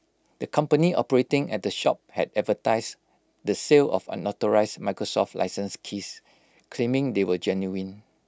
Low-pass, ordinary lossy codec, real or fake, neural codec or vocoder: none; none; real; none